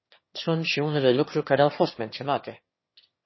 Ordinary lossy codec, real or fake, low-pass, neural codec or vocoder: MP3, 24 kbps; fake; 7.2 kHz; autoencoder, 22.05 kHz, a latent of 192 numbers a frame, VITS, trained on one speaker